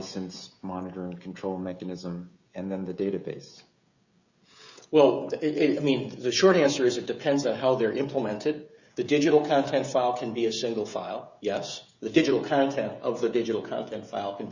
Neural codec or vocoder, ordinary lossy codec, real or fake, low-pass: codec, 16 kHz, 16 kbps, FreqCodec, smaller model; Opus, 64 kbps; fake; 7.2 kHz